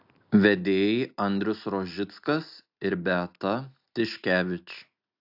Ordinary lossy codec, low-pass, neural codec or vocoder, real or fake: AAC, 48 kbps; 5.4 kHz; none; real